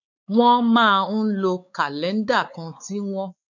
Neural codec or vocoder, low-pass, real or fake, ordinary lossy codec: codec, 16 kHz, 4 kbps, X-Codec, WavLM features, trained on Multilingual LibriSpeech; 7.2 kHz; fake; none